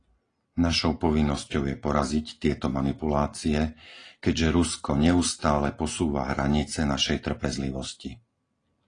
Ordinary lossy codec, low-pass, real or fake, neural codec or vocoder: AAC, 32 kbps; 9.9 kHz; real; none